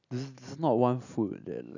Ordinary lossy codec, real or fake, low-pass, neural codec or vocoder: none; real; 7.2 kHz; none